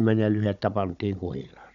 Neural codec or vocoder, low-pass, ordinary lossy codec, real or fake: codec, 16 kHz, 16 kbps, FunCodec, trained on Chinese and English, 50 frames a second; 7.2 kHz; none; fake